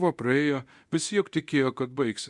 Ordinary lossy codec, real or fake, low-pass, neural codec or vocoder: Opus, 64 kbps; fake; 10.8 kHz; codec, 24 kHz, 0.9 kbps, DualCodec